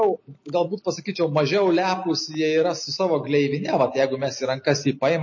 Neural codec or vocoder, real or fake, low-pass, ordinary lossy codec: none; real; 7.2 kHz; MP3, 32 kbps